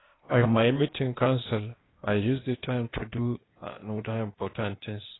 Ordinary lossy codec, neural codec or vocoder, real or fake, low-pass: AAC, 16 kbps; codec, 16 kHz, 0.8 kbps, ZipCodec; fake; 7.2 kHz